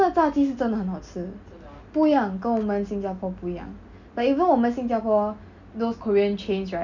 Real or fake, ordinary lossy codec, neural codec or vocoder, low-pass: real; none; none; 7.2 kHz